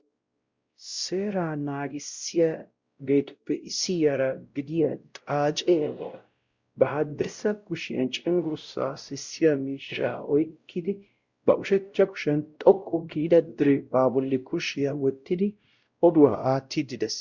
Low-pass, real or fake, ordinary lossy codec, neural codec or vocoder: 7.2 kHz; fake; Opus, 64 kbps; codec, 16 kHz, 0.5 kbps, X-Codec, WavLM features, trained on Multilingual LibriSpeech